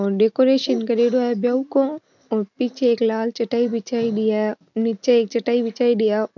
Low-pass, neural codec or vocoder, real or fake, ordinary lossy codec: 7.2 kHz; none; real; none